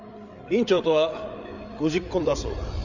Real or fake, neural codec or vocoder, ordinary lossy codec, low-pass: fake; codec, 16 kHz, 8 kbps, FreqCodec, larger model; none; 7.2 kHz